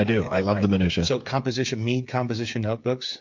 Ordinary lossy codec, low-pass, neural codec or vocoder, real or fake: MP3, 48 kbps; 7.2 kHz; codec, 16 kHz, 8 kbps, FreqCodec, smaller model; fake